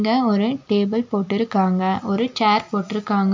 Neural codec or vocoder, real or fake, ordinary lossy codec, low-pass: none; real; MP3, 64 kbps; 7.2 kHz